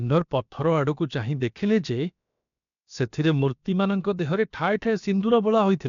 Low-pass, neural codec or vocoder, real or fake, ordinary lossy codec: 7.2 kHz; codec, 16 kHz, about 1 kbps, DyCAST, with the encoder's durations; fake; MP3, 96 kbps